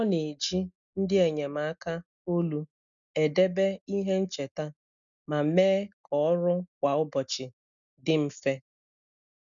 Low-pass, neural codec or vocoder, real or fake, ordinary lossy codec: 7.2 kHz; none; real; none